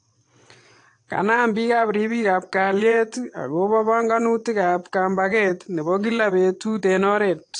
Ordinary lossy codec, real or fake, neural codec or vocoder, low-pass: AAC, 48 kbps; fake; vocoder, 22.05 kHz, 80 mel bands, WaveNeXt; 9.9 kHz